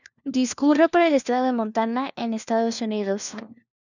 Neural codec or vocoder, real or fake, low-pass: codec, 16 kHz, 1 kbps, FunCodec, trained on LibriTTS, 50 frames a second; fake; 7.2 kHz